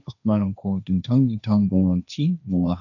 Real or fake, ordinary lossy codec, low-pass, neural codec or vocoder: fake; none; 7.2 kHz; codec, 16 kHz, 1.1 kbps, Voila-Tokenizer